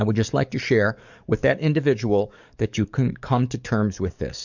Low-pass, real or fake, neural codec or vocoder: 7.2 kHz; fake; codec, 44.1 kHz, 7.8 kbps, DAC